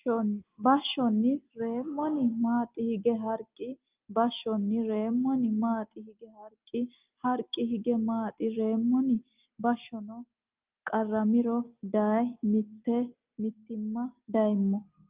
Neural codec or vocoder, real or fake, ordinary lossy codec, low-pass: none; real; Opus, 32 kbps; 3.6 kHz